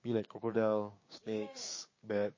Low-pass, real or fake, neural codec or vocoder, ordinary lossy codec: 7.2 kHz; fake; codec, 16 kHz, 6 kbps, DAC; MP3, 32 kbps